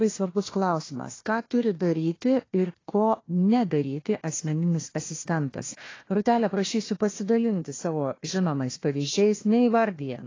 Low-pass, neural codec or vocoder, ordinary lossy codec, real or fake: 7.2 kHz; codec, 16 kHz, 1 kbps, FunCodec, trained on Chinese and English, 50 frames a second; AAC, 32 kbps; fake